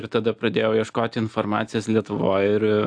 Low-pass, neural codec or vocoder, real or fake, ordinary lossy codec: 9.9 kHz; none; real; AAC, 64 kbps